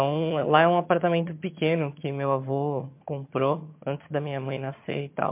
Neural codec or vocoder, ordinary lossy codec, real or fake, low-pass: vocoder, 22.05 kHz, 80 mel bands, HiFi-GAN; MP3, 32 kbps; fake; 3.6 kHz